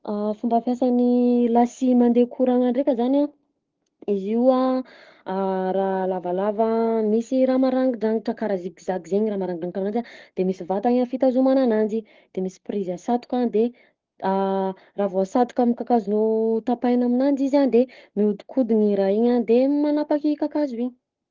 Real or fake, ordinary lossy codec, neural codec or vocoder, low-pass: fake; Opus, 16 kbps; codec, 44.1 kHz, 7.8 kbps, Pupu-Codec; 7.2 kHz